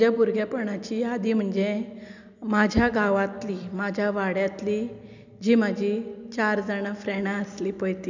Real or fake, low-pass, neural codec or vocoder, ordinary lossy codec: real; 7.2 kHz; none; none